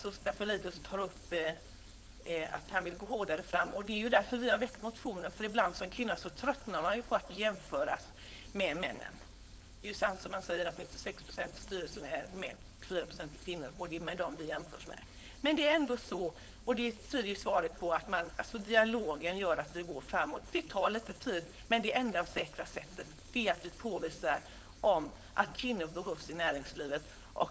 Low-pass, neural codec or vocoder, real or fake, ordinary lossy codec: none; codec, 16 kHz, 4.8 kbps, FACodec; fake; none